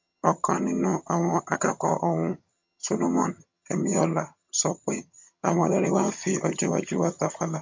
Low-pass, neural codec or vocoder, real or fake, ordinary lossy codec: 7.2 kHz; vocoder, 22.05 kHz, 80 mel bands, HiFi-GAN; fake; MP3, 48 kbps